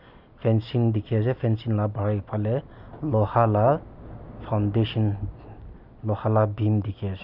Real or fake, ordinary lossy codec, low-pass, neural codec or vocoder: real; none; 5.4 kHz; none